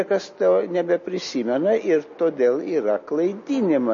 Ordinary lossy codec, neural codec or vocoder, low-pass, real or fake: MP3, 32 kbps; none; 7.2 kHz; real